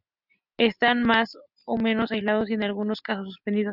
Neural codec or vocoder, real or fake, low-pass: none; real; 5.4 kHz